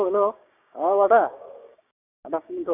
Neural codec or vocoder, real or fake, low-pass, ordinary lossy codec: codec, 16 kHz, 6 kbps, DAC; fake; 3.6 kHz; none